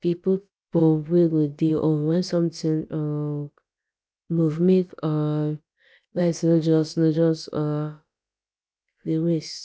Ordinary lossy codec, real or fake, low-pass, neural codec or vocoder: none; fake; none; codec, 16 kHz, about 1 kbps, DyCAST, with the encoder's durations